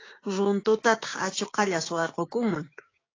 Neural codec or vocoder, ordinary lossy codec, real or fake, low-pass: codec, 16 kHz, 6 kbps, DAC; AAC, 32 kbps; fake; 7.2 kHz